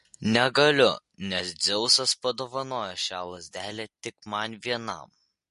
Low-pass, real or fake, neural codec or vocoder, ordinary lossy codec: 14.4 kHz; real; none; MP3, 48 kbps